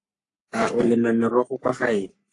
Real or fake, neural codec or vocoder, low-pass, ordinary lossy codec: fake; codec, 44.1 kHz, 3.4 kbps, Pupu-Codec; 10.8 kHz; AAC, 48 kbps